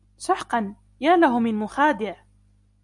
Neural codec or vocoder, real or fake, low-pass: none; real; 10.8 kHz